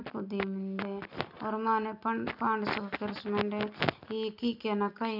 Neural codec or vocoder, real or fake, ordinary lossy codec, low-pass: none; real; none; 5.4 kHz